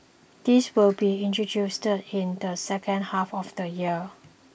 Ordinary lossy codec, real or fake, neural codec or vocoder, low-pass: none; real; none; none